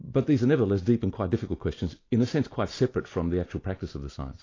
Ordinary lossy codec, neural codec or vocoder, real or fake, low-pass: AAC, 32 kbps; none; real; 7.2 kHz